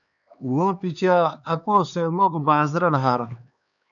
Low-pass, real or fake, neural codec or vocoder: 7.2 kHz; fake; codec, 16 kHz, 2 kbps, X-Codec, HuBERT features, trained on LibriSpeech